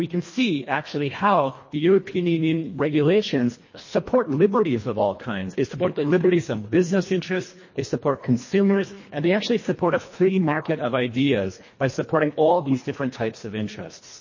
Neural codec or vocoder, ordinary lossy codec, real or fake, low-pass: codec, 24 kHz, 1.5 kbps, HILCodec; MP3, 32 kbps; fake; 7.2 kHz